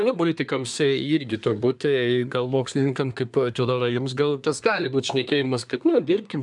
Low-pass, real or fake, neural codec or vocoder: 10.8 kHz; fake; codec, 24 kHz, 1 kbps, SNAC